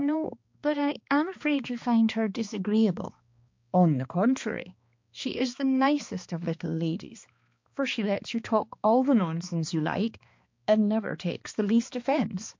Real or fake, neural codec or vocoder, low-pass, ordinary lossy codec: fake; codec, 16 kHz, 2 kbps, X-Codec, HuBERT features, trained on balanced general audio; 7.2 kHz; MP3, 48 kbps